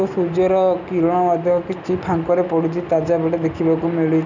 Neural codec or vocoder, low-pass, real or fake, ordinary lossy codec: none; 7.2 kHz; real; none